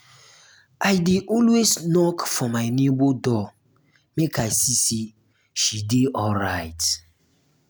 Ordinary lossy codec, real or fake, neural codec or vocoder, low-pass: none; real; none; none